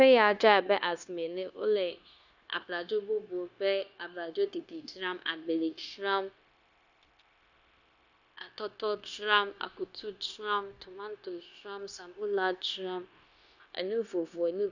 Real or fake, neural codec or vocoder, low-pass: fake; codec, 16 kHz, 0.9 kbps, LongCat-Audio-Codec; 7.2 kHz